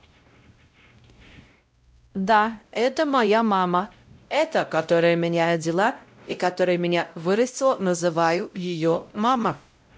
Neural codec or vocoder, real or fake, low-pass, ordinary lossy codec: codec, 16 kHz, 0.5 kbps, X-Codec, WavLM features, trained on Multilingual LibriSpeech; fake; none; none